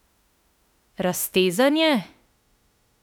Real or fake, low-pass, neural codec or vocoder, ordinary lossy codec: fake; 19.8 kHz; autoencoder, 48 kHz, 32 numbers a frame, DAC-VAE, trained on Japanese speech; none